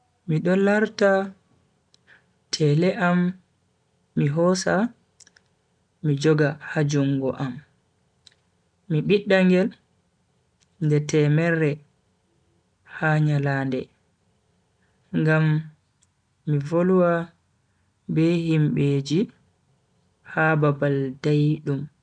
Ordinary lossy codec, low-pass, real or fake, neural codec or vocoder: none; 9.9 kHz; real; none